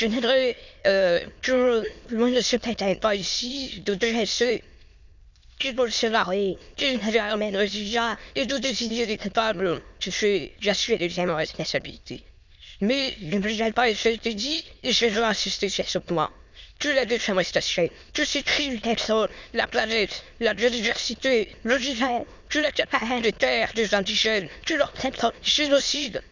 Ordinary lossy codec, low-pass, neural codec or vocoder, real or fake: none; 7.2 kHz; autoencoder, 22.05 kHz, a latent of 192 numbers a frame, VITS, trained on many speakers; fake